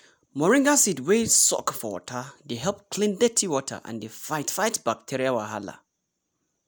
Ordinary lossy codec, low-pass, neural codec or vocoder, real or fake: none; none; none; real